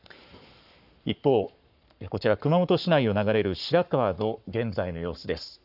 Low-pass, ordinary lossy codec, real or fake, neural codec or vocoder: 5.4 kHz; none; fake; codec, 44.1 kHz, 7.8 kbps, Pupu-Codec